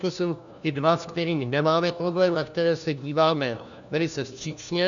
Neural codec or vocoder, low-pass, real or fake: codec, 16 kHz, 1 kbps, FunCodec, trained on LibriTTS, 50 frames a second; 7.2 kHz; fake